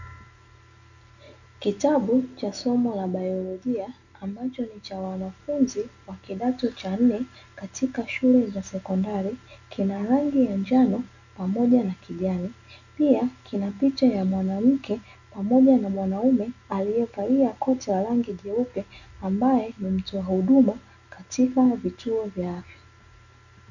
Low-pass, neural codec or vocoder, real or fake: 7.2 kHz; none; real